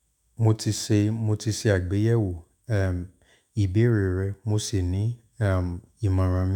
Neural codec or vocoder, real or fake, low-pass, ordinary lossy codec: autoencoder, 48 kHz, 128 numbers a frame, DAC-VAE, trained on Japanese speech; fake; 19.8 kHz; none